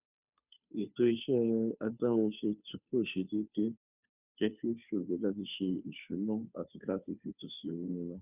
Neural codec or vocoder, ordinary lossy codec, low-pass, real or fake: codec, 16 kHz, 2 kbps, FunCodec, trained on Chinese and English, 25 frames a second; none; 3.6 kHz; fake